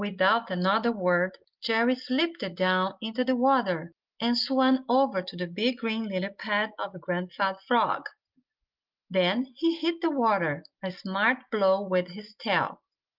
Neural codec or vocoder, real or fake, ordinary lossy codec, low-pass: none; real; Opus, 32 kbps; 5.4 kHz